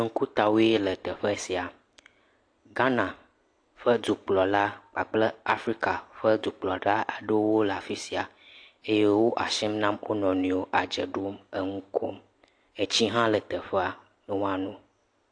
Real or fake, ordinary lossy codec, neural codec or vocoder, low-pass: real; AAC, 48 kbps; none; 9.9 kHz